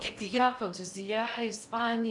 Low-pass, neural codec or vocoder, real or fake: 10.8 kHz; codec, 16 kHz in and 24 kHz out, 0.6 kbps, FocalCodec, streaming, 4096 codes; fake